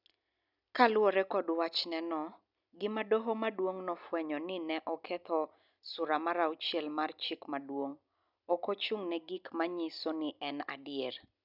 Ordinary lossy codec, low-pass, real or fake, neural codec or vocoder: none; 5.4 kHz; real; none